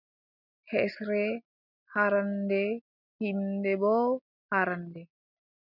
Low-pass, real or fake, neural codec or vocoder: 5.4 kHz; real; none